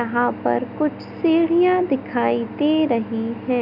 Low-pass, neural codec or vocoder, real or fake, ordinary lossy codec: 5.4 kHz; none; real; none